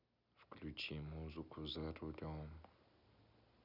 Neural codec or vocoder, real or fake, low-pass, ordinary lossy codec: none; real; 5.4 kHz; none